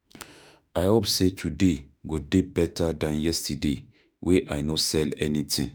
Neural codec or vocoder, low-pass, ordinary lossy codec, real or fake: autoencoder, 48 kHz, 32 numbers a frame, DAC-VAE, trained on Japanese speech; none; none; fake